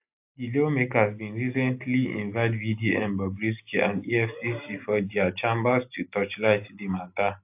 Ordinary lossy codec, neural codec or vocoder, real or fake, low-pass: AAC, 32 kbps; none; real; 3.6 kHz